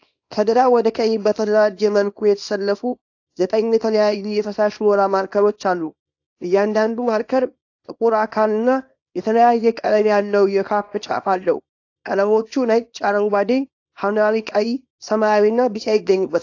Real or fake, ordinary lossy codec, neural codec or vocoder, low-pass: fake; AAC, 48 kbps; codec, 24 kHz, 0.9 kbps, WavTokenizer, small release; 7.2 kHz